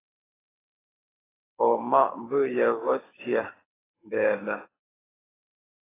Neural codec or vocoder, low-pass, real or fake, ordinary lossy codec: codec, 24 kHz, 6 kbps, HILCodec; 3.6 kHz; fake; AAC, 16 kbps